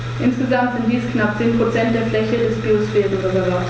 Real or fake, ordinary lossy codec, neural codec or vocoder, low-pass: real; none; none; none